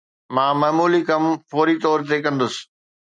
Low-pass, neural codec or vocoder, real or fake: 9.9 kHz; none; real